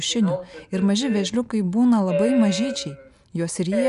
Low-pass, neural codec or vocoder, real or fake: 10.8 kHz; none; real